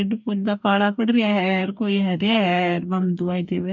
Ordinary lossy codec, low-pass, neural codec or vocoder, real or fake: none; 7.2 kHz; codec, 44.1 kHz, 2.6 kbps, DAC; fake